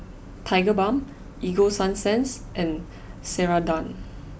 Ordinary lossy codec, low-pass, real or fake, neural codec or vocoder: none; none; real; none